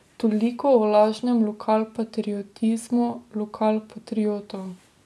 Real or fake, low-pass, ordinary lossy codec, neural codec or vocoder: real; none; none; none